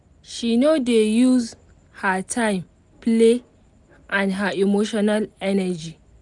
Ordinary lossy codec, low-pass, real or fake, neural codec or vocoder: none; 10.8 kHz; real; none